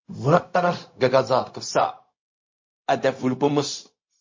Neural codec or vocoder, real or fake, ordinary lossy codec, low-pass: codec, 16 kHz, 0.4 kbps, LongCat-Audio-Codec; fake; MP3, 32 kbps; 7.2 kHz